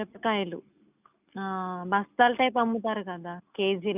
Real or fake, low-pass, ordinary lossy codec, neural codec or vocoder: real; 3.6 kHz; none; none